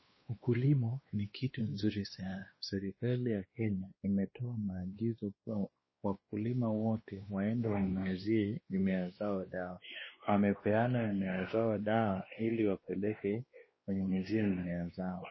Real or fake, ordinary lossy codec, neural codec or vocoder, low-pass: fake; MP3, 24 kbps; codec, 16 kHz, 2 kbps, X-Codec, WavLM features, trained on Multilingual LibriSpeech; 7.2 kHz